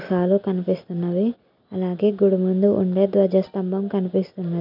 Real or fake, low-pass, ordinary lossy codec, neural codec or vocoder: real; 5.4 kHz; none; none